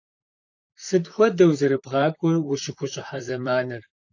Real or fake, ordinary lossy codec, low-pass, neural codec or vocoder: fake; AAC, 48 kbps; 7.2 kHz; vocoder, 44.1 kHz, 128 mel bands, Pupu-Vocoder